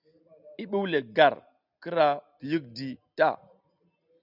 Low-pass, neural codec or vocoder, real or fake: 5.4 kHz; none; real